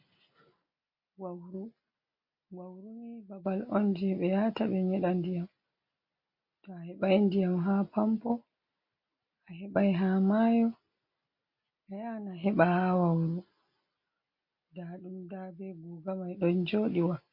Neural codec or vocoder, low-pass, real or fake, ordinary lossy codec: none; 5.4 kHz; real; MP3, 32 kbps